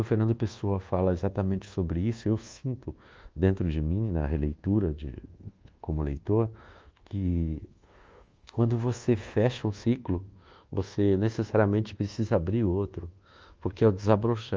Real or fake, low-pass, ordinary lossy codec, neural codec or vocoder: fake; 7.2 kHz; Opus, 32 kbps; codec, 24 kHz, 1.2 kbps, DualCodec